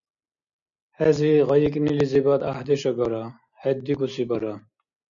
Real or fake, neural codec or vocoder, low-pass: real; none; 7.2 kHz